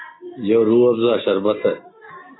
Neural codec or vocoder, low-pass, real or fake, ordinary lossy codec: none; 7.2 kHz; real; AAC, 16 kbps